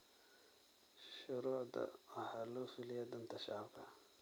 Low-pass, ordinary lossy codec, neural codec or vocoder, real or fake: none; none; vocoder, 44.1 kHz, 128 mel bands every 256 samples, BigVGAN v2; fake